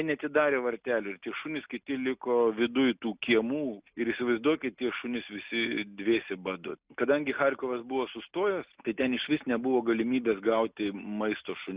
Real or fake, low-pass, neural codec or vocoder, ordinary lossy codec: real; 3.6 kHz; none; Opus, 64 kbps